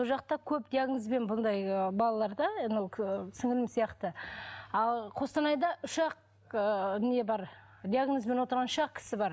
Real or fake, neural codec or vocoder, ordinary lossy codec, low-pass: real; none; none; none